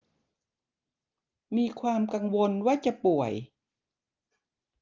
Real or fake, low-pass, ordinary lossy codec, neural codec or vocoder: real; 7.2 kHz; Opus, 24 kbps; none